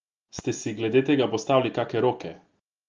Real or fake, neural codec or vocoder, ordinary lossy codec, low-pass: real; none; Opus, 24 kbps; 7.2 kHz